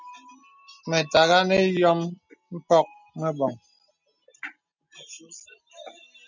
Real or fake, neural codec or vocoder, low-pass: real; none; 7.2 kHz